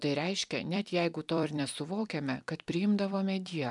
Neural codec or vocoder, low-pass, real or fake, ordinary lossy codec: vocoder, 44.1 kHz, 128 mel bands every 256 samples, BigVGAN v2; 10.8 kHz; fake; MP3, 96 kbps